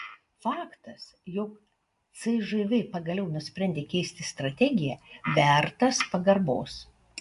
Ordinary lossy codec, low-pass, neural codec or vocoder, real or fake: AAC, 64 kbps; 10.8 kHz; none; real